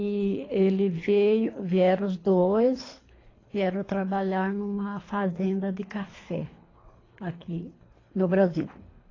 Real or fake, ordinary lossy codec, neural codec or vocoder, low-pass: fake; AAC, 32 kbps; codec, 24 kHz, 3 kbps, HILCodec; 7.2 kHz